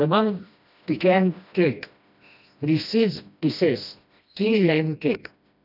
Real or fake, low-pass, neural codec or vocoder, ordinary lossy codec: fake; 5.4 kHz; codec, 16 kHz, 1 kbps, FreqCodec, smaller model; none